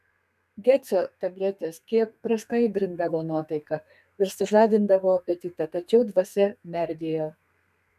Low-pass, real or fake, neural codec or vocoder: 14.4 kHz; fake; codec, 32 kHz, 1.9 kbps, SNAC